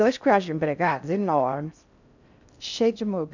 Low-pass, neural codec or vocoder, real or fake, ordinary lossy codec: 7.2 kHz; codec, 16 kHz in and 24 kHz out, 0.6 kbps, FocalCodec, streaming, 4096 codes; fake; none